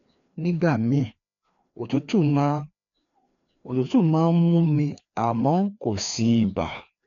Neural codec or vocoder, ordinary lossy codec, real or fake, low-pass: codec, 16 kHz, 2 kbps, FreqCodec, larger model; none; fake; 7.2 kHz